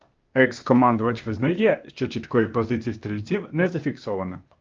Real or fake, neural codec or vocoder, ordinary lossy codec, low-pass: fake; codec, 16 kHz, 0.8 kbps, ZipCodec; Opus, 24 kbps; 7.2 kHz